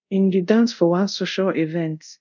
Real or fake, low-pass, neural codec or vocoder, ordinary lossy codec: fake; 7.2 kHz; codec, 24 kHz, 0.5 kbps, DualCodec; none